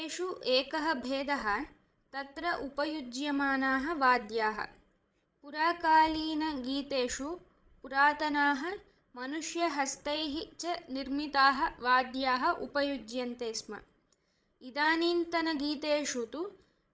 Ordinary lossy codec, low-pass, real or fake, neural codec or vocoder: none; none; fake; codec, 16 kHz, 16 kbps, FreqCodec, larger model